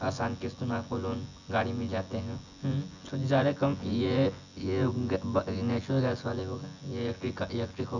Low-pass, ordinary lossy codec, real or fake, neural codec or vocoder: 7.2 kHz; none; fake; vocoder, 24 kHz, 100 mel bands, Vocos